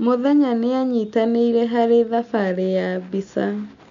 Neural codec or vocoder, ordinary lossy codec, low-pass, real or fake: none; MP3, 96 kbps; 7.2 kHz; real